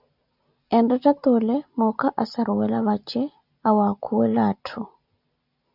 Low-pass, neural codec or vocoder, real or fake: 5.4 kHz; none; real